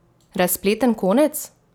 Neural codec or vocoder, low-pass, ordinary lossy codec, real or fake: none; none; none; real